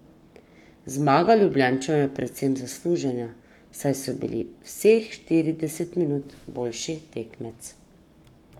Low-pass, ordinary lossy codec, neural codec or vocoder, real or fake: 19.8 kHz; none; codec, 44.1 kHz, 7.8 kbps, Pupu-Codec; fake